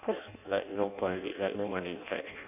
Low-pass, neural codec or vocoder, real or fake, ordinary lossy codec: 3.6 kHz; codec, 16 kHz in and 24 kHz out, 0.6 kbps, FireRedTTS-2 codec; fake; none